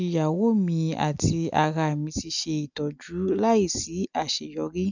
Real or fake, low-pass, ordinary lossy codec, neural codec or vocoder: real; 7.2 kHz; none; none